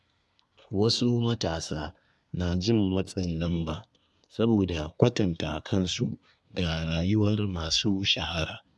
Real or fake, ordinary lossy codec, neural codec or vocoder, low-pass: fake; none; codec, 24 kHz, 1 kbps, SNAC; none